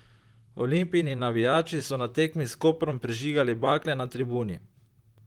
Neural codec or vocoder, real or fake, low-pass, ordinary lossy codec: vocoder, 44.1 kHz, 128 mel bands, Pupu-Vocoder; fake; 19.8 kHz; Opus, 24 kbps